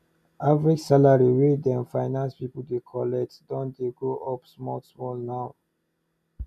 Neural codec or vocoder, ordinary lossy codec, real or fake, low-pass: none; none; real; 14.4 kHz